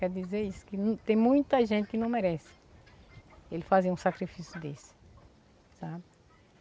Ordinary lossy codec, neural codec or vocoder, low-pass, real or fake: none; none; none; real